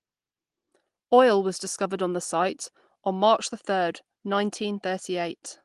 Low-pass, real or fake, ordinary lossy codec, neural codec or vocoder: 10.8 kHz; real; Opus, 24 kbps; none